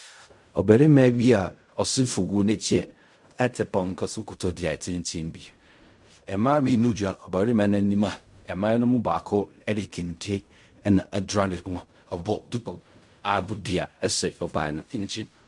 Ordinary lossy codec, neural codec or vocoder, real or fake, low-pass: MP3, 64 kbps; codec, 16 kHz in and 24 kHz out, 0.4 kbps, LongCat-Audio-Codec, fine tuned four codebook decoder; fake; 10.8 kHz